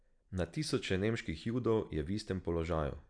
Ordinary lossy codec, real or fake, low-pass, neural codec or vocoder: none; real; 9.9 kHz; none